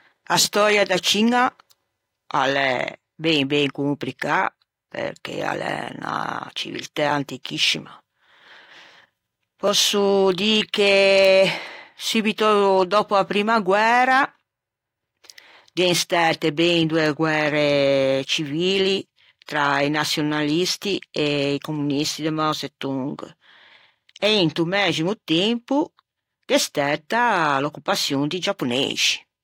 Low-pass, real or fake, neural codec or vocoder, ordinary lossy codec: 19.8 kHz; real; none; AAC, 48 kbps